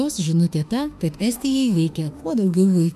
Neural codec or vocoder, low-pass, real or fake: autoencoder, 48 kHz, 32 numbers a frame, DAC-VAE, trained on Japanese speech; 14.4 kHz; fake